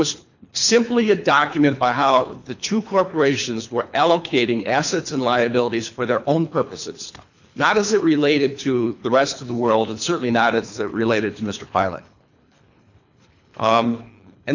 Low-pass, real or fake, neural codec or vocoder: 7.2 kHz; fake; codec, 24 kHz, 3 kbps, HILCodec